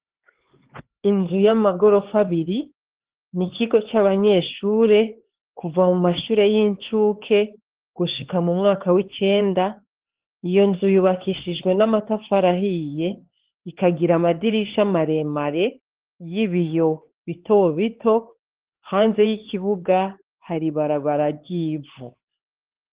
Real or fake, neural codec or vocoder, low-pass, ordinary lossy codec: fake; codec, 16 kHz, 4 kbps, X-Codec, HuBERT features, trained on LibriSpeech; 3.6 kHz; Opus, 16 kbps